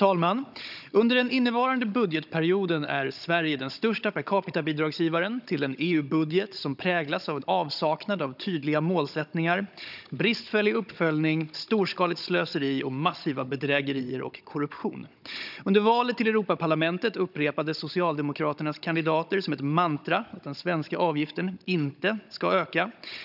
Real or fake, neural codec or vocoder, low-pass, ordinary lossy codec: fake; codec, 16 kHz, 16 kbps, FunCodec, trained on Chinese and English, 50 frames a second; 5.4 kHz; none